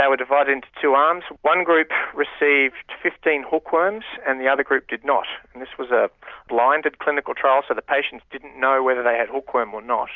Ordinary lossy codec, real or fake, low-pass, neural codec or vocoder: Opus, 64 kbps; real; 7.2 kHz; none